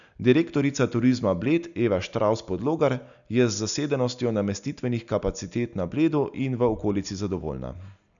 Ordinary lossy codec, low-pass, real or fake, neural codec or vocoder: none; 7.2 kHz; real; none